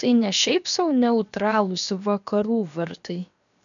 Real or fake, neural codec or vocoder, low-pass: fake; codec, 16 kHz, 0.7 kbps, FocalCodec; 7.2 kHz